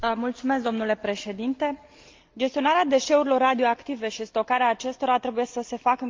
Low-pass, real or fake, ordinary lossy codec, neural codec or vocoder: 7.2 kHz; real; Opus, 24 kbps; none